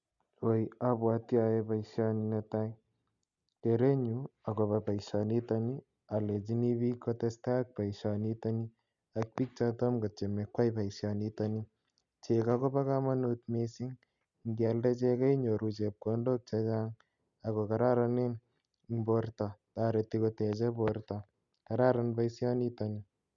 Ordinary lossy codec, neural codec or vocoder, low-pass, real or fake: none; none; 7.2 kHz; real